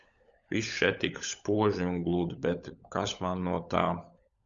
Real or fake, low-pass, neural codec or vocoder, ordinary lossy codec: fake; 7.2 kHz; codec, 16 kHz, 16 kbps, FunCodec, trained on LibriTTS, 50 frames a second; Opus, 64 kbps